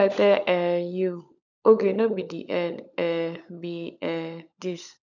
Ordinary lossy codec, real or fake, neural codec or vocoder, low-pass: none; fake; codec, 16 kHz, 16 kbps, FunCodec, trained on LibriTTS, 50 frames a second; 7.2 kHz